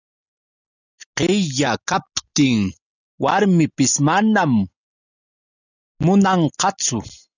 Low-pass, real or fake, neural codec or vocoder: 7.2 kHz; real; none